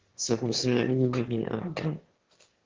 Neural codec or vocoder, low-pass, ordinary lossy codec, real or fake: autoencoder, 22.05 kHz, a latent of 192 numbers a frame, VITS, trained on one speaker; 7.2 kHz; Opus, 16 kbps; fake